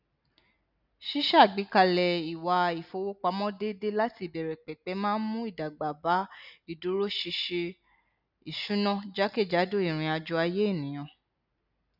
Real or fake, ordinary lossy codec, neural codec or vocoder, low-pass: real; none; none; 5.4 kHz